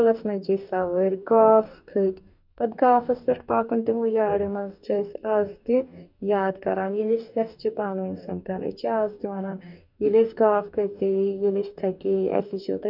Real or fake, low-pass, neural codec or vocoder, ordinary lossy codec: fake; 5.4 kHz; codec, 44.1 kHz, 2.6 kbps, DAC; none